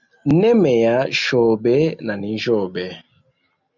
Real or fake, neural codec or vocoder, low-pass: real; none; 7.2 kHz